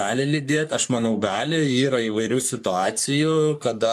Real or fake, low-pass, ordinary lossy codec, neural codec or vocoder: fake; 14.4 kHz; AAC, 96 kbps; codec, 44.1 kHz, 3.4 kbps, Pupu-Codec